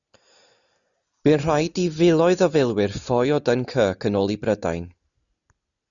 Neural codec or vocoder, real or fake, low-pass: none; real; 7.2 kHz